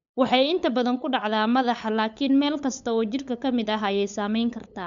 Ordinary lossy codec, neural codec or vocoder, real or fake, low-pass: MP3, 96 kbps; codec, 16 kHz, 8 kbps, FunCodec, trained on LibriTTS, 25 frames a second; fake; 7.2 kHz